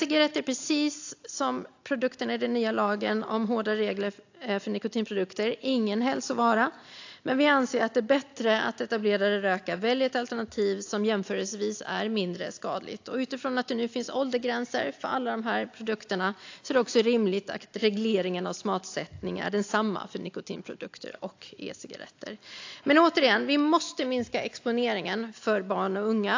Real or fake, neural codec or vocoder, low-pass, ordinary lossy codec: real; none; 7.2 kHz; AAC, 48 kbps